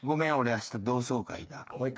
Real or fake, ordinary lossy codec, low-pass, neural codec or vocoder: fake; none; none; codec, 16 kHz, 4 kbps, FreqCodec, smaller model